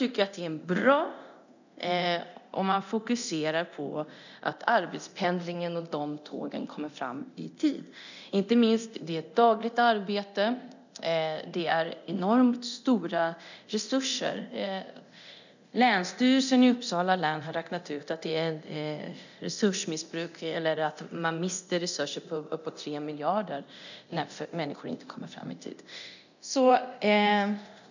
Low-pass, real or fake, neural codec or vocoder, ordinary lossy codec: 7.2 kHz; fake; codec, 24 kHz, 0.9 kbps, DualCodec; none